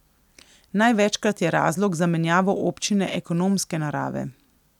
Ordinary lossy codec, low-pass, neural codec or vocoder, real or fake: none; 19.8 kHz; none; real